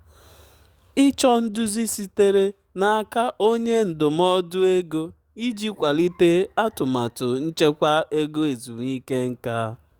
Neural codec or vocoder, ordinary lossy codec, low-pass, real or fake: codec, 44.1 kHz, 7.8 kbps, DAC; Opus, 64 kbps; 19.8 kHz; fake